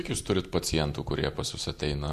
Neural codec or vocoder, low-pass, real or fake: none; 14.4 kHz; real